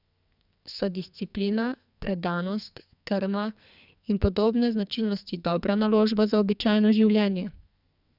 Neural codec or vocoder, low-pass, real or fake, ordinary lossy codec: codec, 44.1 kHz, 2.6 kbps, SNAC; 5.4 kHz; fake; none